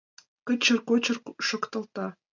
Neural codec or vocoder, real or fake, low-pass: none; real; 7.2 kHz